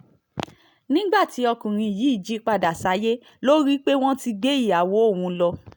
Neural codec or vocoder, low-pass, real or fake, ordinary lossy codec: none; none; real; none